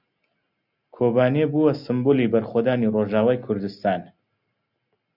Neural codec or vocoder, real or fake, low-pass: none; real; 5.4 kHz